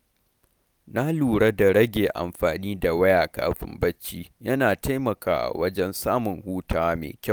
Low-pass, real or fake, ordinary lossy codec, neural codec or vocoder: none; real; none; none